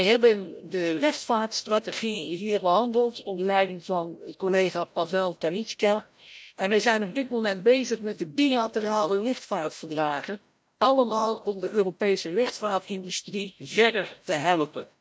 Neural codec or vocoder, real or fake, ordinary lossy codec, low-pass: codec, 16 kHz, 0.5 kbps, FreqCodec, larger model; fake; none; none